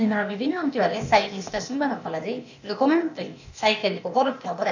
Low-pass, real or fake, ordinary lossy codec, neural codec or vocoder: 7.2 kHz; fake; none; codec, 16 kHz, 0.8 kbps, ZipCodec